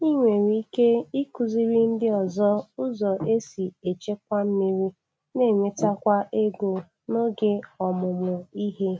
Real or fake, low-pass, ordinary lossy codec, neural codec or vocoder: real; none; none; none